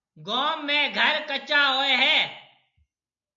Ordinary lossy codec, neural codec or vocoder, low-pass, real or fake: MP3, 64 kbps; none; 7.2 kHz; real